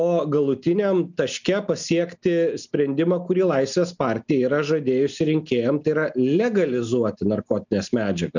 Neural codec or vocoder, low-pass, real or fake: none; 7.2 kHz; real